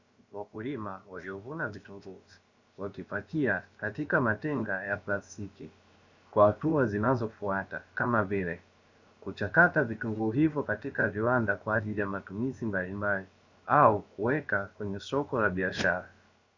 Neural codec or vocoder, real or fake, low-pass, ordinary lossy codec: codec, 16 kHz, about 1 kbps, DyCAST, with the encoder's durations; fake; 7.2 kHz; Opus, 64 kbps